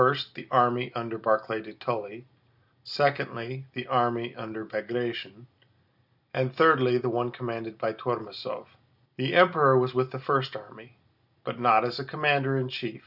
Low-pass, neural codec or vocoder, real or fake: 5.4 kHz; none; real